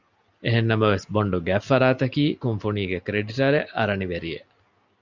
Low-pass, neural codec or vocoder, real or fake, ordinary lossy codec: 7.2 kHz; none; real; Opus, 64 kbps